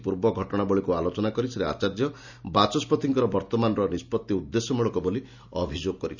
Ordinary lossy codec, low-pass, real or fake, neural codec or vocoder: none; 7.2 kHz; real; none